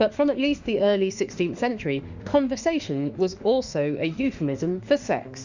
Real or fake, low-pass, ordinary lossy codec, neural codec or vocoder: fake; 7.2 kHz; Opus, 64 kbps; autoencoder, 48 kHz, 32 numbers a frame, DAC-VAE, trained on Japanese speech